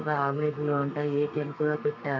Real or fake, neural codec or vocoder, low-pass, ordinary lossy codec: fake; codec, 32 kHz, 1.9 kbps, SNAC; 7.2 kHz; none